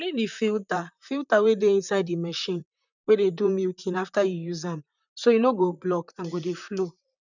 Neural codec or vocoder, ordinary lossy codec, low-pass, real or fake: vocoder, 44.1 kHz, 128 mel bands, Pupu-Vocoder; none; 7.2 kHz; fake